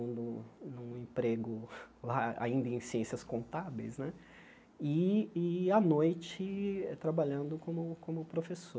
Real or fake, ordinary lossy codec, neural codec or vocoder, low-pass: real; none; none; none